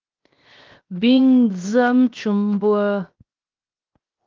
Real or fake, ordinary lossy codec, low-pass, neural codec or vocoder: fake; Opus, 32 kbps; 7.2 kHz; codec, 16 kHz, 0.7 kbps, FocalCodec